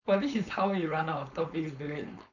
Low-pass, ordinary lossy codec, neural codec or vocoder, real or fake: 7.2 kHz; none; codec, 16 kHz, 4.8 kbps, FACodec; fake